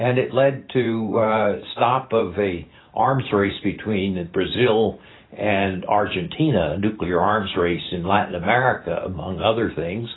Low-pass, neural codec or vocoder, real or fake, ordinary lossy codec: 7.2 kHz; vocoder, 44.1 kHz, 128 mel bands every 512 samples, BigVGAN v2; fake; AAC, 16 kbps